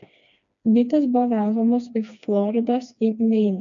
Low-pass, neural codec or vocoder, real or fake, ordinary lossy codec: 7.2 kHz; codec, 16 kHz, 2 kbps, FreqCodec, smaller model; fake; MP3, 64 kbps